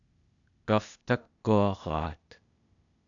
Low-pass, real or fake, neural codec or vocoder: 7.2 kHz; fake; codec, 16 kHz, 0.8 kbps, ZipCodec